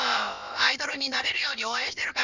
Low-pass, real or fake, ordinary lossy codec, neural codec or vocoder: 7.2 kHz; fake; none; codec, 16 kHz, about 1 kbps, DyCAST, with the encoder's durations